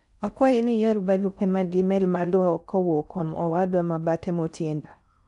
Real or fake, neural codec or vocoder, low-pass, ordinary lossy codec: fake; codec, 16 kHz in and 24 kHz out, 0.6 kbps, FocalCodec, streaming, 4096 codes; 10.8 kHz; none